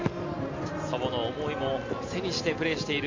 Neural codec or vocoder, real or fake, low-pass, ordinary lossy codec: none; real; 7.2 kHz; MP3, 48 kbps